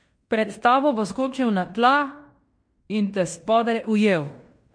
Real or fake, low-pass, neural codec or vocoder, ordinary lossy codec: fake; 9.9 kHz; codec, 16 kHz in and 24 kHz out, 0.9 kbps, LongCat-Audio-Codec, fine tuned four codebook decoder; MP3, 48 kbps